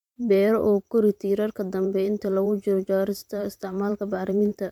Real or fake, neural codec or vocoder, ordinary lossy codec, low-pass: fake; vocoder, 44.1 kHz, 128 mel bands every 512 samples, BigVGAN v2; MP3, 96 kbps; 19.8 kHz